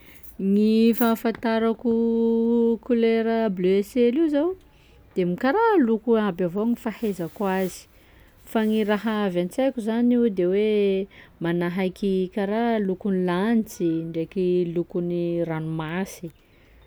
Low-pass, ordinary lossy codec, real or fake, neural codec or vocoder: none; none; real; none